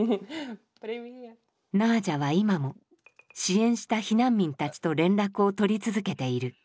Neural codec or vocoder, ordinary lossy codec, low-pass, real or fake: none; none; none; real